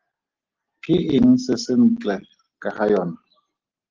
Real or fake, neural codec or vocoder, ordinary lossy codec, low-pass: real; none; Opus, 16 kbps; 7.2 kHz